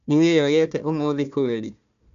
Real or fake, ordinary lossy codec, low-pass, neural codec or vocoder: fake; none; 7.2 kHz; codec, 16 kHz, 1 kbps, FunCodec, trained on Chinese and English, 50 frames a second